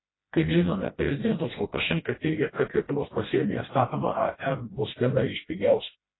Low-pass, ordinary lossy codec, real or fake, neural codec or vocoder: 7.2 kHz; AAC, 16 kbps; fake; codec, 16 kHz, 1 kbps, FreqCodec, smaller model